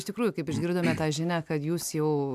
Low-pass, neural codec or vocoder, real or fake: 14.4 kHz; none; real